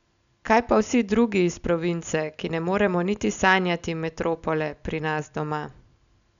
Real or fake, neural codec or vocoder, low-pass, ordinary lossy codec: real; none; 7.2 kHz; none